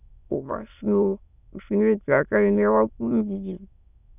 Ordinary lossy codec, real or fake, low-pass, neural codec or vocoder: none; fake; 3.6 kHz; autoencoder, 22.05 kHz, a latent of 192 numbers a frame, VITS, trained on many speakers